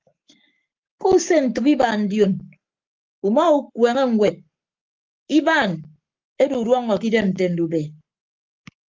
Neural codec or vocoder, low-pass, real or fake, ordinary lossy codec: codec, 44.1 kHz, 7.8 kbps, Pupu-Codec; 7.2 kHz; fake; Opus, 32 kbps